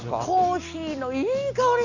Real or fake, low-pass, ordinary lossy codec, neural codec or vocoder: real; 7.2 kHz; none; none